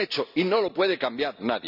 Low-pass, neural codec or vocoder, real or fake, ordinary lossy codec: 5.4 kHz; none; real; none